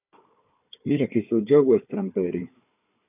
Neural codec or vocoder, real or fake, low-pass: codec, 16 kHz, 4 kbps, FunCodec, trained on Chinese and English, 50 frames a second; fake; 3.6 kHz